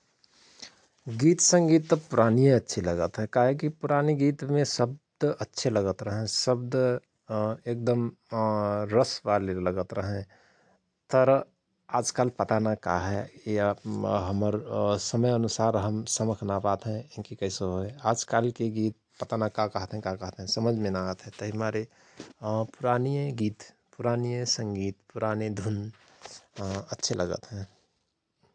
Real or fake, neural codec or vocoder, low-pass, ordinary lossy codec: real; none; 9.9 kHz; AAC, 64 kbps